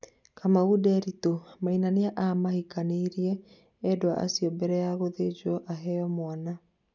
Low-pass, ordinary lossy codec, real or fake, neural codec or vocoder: 7.2 kHz; none; real; none